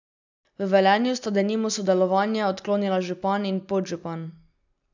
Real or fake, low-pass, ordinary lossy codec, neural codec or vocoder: real; 7.2 kHz; none; none